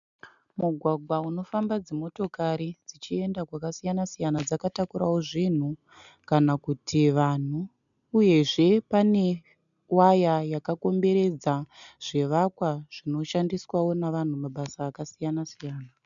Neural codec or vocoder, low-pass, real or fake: none; 7.2 kHz; real